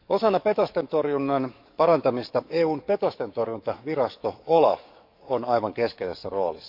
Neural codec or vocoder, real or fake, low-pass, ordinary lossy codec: codec, 44.1 kHz, 7.8 kbps, DAC; fake; 5.4 kHz; MP3, 48 kbps